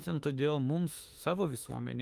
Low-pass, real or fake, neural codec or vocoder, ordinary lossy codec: 14.4 kHz; fake; autoencoder, 48 kHz, 32 numbers a frame, DAC-VAE, trained on Japanese speech; Opus, 32 kbps